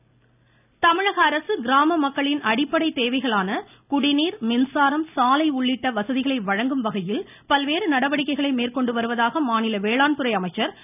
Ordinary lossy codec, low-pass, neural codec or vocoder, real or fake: none; 3.6 kHz; none; real